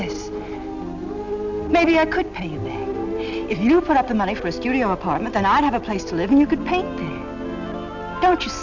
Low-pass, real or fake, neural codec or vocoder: 7.2 kHz; real; none